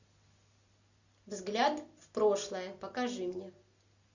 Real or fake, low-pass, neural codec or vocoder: real; 7.2 kHz; none